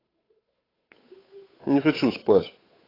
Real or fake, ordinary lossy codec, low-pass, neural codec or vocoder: fake; AAC, 24 kbps; 5.4 kHz; codec, 16 kHz, 8 kbps, FunCodec, trained on Chinese and English, 25 frames a second